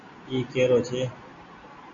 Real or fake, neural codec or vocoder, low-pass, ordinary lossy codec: real; none; 7.2 kHz; MP3, 48 kbps